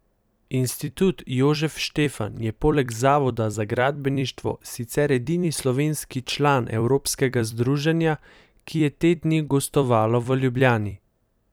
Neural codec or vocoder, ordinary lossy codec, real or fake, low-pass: vocoder, 44.1 kHz, 128 mel bands every 256 samples, BigVGAN v2; none; fake; none